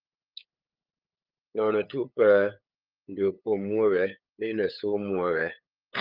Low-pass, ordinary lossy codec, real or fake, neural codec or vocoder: 5.4 kHz; Opus, 24 kbps; fake; codec, 16 kHz, 8 kbps, FunCodec, trained on LibriTTS, 25 frames a second